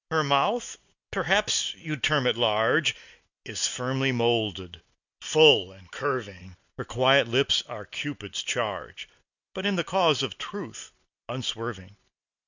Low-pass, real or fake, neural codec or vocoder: 7.2 kHz; real; none